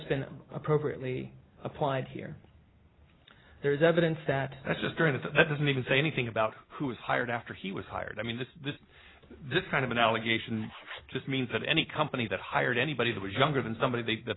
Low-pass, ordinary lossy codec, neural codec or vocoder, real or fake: 7.2 kHz; AAC, 16 kbps; none; real